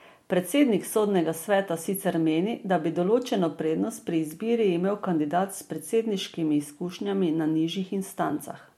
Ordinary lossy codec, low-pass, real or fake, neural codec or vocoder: MP3, 64 kbps; 19.8 kHz; real; none